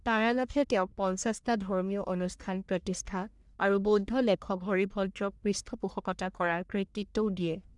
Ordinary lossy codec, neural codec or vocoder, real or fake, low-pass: none; codec, 44.1 kHz, 1.7 kbps, Pupu-Codec; fake; 10.8 kHz